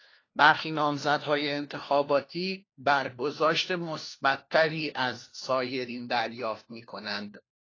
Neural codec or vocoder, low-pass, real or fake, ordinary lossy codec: codec, 16 kHz, 1 kbps, FunCodec, trained on LibriTTS, 50 frames a second; 7.2 kHz; fake; AAC, 32 kbps